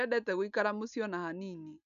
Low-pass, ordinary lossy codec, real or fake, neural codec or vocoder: 7.2 kHz; none; real; none